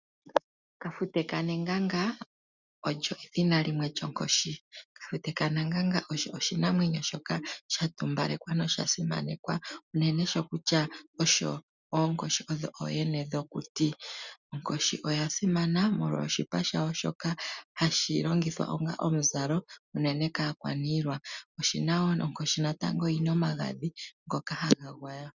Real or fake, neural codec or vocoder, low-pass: real; none; 7.2 kHz